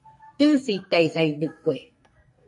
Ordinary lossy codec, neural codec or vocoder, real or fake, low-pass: MP3, 48 kbps; codec, 44.1 kHz, 2.6 kbps, SNAC; fake; 10.8 kHz